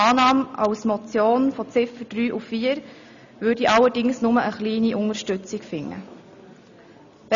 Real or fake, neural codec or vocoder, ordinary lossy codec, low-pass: real; none; none; 7.2 kHz